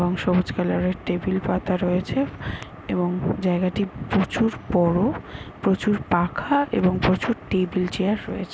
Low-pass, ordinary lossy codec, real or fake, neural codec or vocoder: none; none; real; none